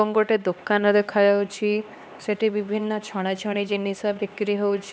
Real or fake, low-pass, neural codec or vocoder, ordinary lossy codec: fake; none; codec, 16 kHz, 2 kbps, X-Codec, HuBERT features, trained on LibriSpeech; none